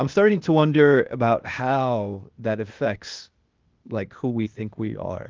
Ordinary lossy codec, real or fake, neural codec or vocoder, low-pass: Opus, 32 kbps; fake; codec, 16 kHz, 0.8 kbps, ZipCodec; 7.2 kHz